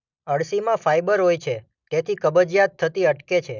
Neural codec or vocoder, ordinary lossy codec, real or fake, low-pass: none; none; real; 7.2 kHz